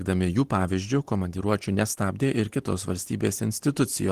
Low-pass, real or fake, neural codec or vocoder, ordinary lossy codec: 14.4 kHz; real; none; Opus, 16 kbps